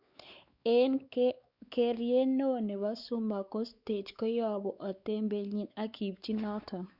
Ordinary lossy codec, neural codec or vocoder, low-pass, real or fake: Opus, 64 kbps; codec, 16 kHz, 4 kbps, X-Codec, WavLM features, trained on Multilingual LibriSpeech; 5.4 kHz; fake